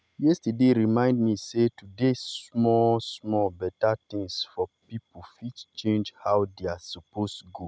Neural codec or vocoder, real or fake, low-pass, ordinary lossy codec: none; real; none; none